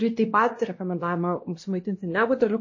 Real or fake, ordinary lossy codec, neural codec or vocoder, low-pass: fake; MP3, 32 kbps; codec, 16 kHz, 1 kbps, X-Codec, WavLM features, trained on Multilingual LibriSpeech; 7.2 kHz